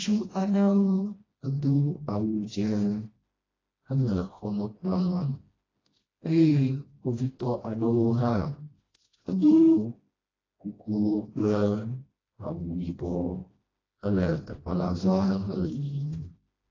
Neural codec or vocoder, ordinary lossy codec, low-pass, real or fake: codec, 16 kHz, 1 kbps, FreqCodec, smaller model; AAC, 32 kbps; 7.2 kHz; fake